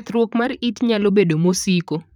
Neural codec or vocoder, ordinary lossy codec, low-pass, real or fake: vocoder, 44.1 kHz, 128 mel bands, Pupu-Vocoder; none; 19.8 kHz; fake